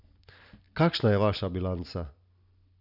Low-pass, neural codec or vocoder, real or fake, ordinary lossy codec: 5.4 kHz; none; real; none